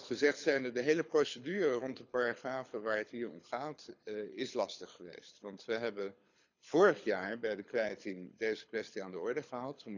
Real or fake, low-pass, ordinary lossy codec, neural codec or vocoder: fake; 7.2 kHz; none; codec, 24 kHz, 6 kbps, HILCodec